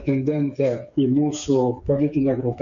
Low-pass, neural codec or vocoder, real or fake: 7.2 kHz; codec, 16 kHz, 4 kbps, FreqCodec, smaller model; fake